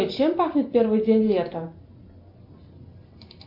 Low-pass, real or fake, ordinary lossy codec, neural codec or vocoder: 5.4 kHz; real; MP3, 48 kbps; none